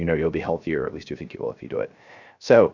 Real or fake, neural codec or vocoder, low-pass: fake; codec, 16 kHz, 0.3 kbps, FocalCodec; 7.2 kHz